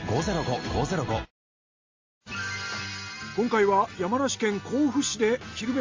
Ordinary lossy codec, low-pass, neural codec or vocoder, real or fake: Opus, 32 kbps; 7.2 kHz; none; real